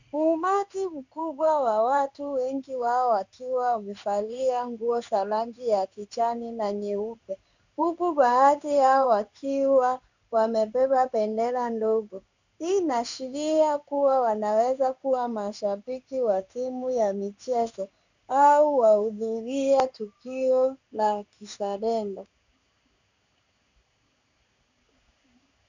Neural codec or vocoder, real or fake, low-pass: codec, 16 kHz in and 24 kHz out, 1 kbps, XY-Tokenizer; fake; 7.2 kHz